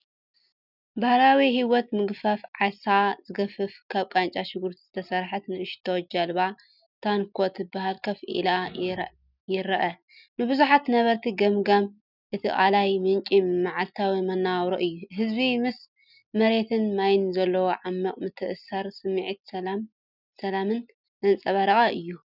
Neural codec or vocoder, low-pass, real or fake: none; 5.4 kHz; real